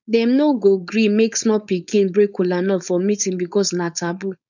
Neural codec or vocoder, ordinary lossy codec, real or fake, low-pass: codec, 16 kHz, 4.8 kbps, FACodec; none; fake; 7.2 kHz